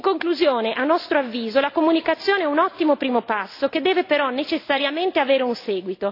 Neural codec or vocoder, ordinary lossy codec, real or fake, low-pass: none; AAC, 32 kbps; real; 5.4 kHz